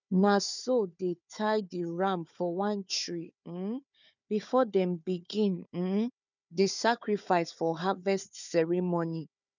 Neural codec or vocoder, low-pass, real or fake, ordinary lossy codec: codec, 16 kHz, 4 kbps, FunCodec, trained on Chinese and English, 50 frames a second; 7.2 kHz; fake; none